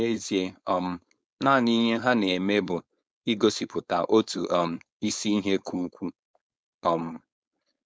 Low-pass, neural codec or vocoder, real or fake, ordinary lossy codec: none; codec, 16 kHz, 4.8 kbps, FACodec; fake; none